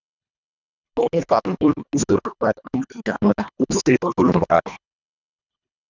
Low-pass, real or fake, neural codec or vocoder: 7.2 kHz; fake; codec, 24 kHz, 1.5 kbps, HILCodec